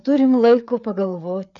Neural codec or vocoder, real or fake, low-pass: codec, 16 kHz, 4 kbps, FreqCodec, larger model; fake; 7.2 kHz